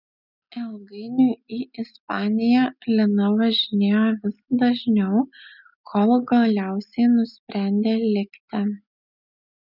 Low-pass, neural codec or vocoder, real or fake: 5.4 kHz; none; real